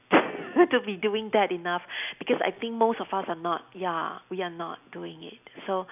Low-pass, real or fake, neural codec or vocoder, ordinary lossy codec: 3.6 kHz; real; none; none